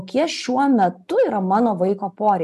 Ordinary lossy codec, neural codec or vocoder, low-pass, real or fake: MP3, 96 kbps; none; 14.4 kHz; real